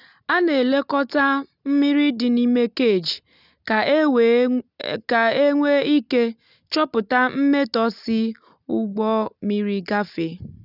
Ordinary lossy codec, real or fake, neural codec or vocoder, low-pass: none; real; none; 5.4 kHz